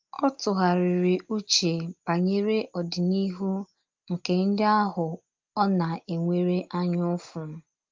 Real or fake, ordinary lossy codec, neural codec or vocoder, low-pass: real; Opus, 32 kbps; none; 7.2 kHz